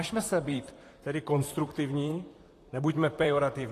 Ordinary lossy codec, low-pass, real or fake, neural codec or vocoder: AAC, 48 kbps; 14.4 kHz; fake; vocoder, 44.1 kHz, 128 mel bands, Pupu-Vocoder